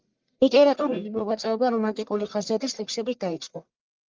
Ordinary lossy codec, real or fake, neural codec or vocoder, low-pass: Opus, 32 kbps; fake; codec, 44.1 kHz, 1.7 kbps, Pupu-Codec; 7.2 kHz